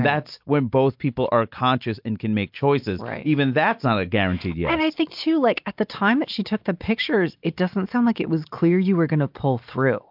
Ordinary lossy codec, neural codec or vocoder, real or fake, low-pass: MP3, 48 kbps; none; real; 5.4 kHz